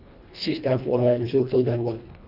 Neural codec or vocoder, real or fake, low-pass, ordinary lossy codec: codec, 24 kHz, 1.5 kbps, HILCodec; fake; 5.4 kHz; none